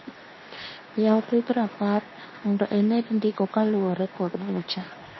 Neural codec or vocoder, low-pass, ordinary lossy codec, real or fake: codec, 24 kHz, 0.9 kbps, WavTokenizer, medium speech release version 2; 7.2 kHz; MP3, 24 kbps; fake